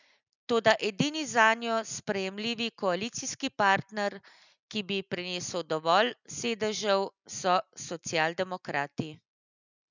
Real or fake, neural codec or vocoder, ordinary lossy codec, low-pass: real; none; none; 7.2 kHz